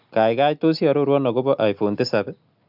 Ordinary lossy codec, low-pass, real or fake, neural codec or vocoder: none; 5.4 kHz; real; none